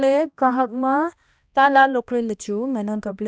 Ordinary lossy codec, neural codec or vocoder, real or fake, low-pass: none; codec, 16 kHz, 1 kbps, X-Codec, HuBERT features, trained on balanced general audio; fake; none